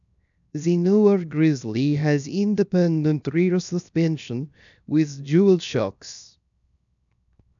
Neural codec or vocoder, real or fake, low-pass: codec, 16 kHz, 0.7 kbps, FocalCodec; fake; 7.2 kHz